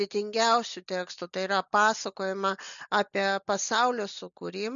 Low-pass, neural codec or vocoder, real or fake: 7.2 kHz; none; real